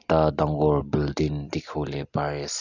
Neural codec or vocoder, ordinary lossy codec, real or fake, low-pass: none; none; real; 7.2 kHz